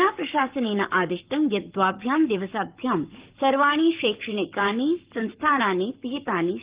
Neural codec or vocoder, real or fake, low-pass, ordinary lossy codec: codec, 44.1 kHz, 7.8 kbps, Pupu-Codec; fake; 3.6 kHz; Opus, 32 kbps